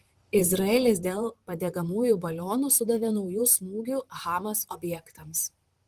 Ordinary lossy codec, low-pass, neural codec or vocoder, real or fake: Opus, 24 kbps; 14.4 kHz; vocoder, 44.1 kHz, 128 mel bands, Pupu-Vocoder; fake